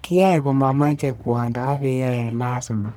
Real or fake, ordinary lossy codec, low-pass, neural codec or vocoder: fake; none; none; codec, 44.1 kHz, 1.7 kbps, Pupu-Codec